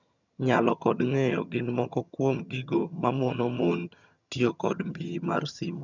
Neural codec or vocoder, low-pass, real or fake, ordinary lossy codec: vocoder, 22.05 kHz, 80 mel bands, HiFi-GAN; 7.2 kHz; fake; none